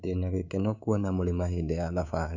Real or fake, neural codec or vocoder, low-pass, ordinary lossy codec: fake; codec, 24 kHz, 3.1 kbps, DualCodec; 7.2 kHz; Opus, 64 kbps